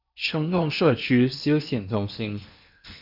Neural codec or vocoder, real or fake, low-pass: codec, 16 kHz in and 24 kHz out, 0.8 kbps, FocalCodec, streaming, 65536 codes; fake; 5.4 kHz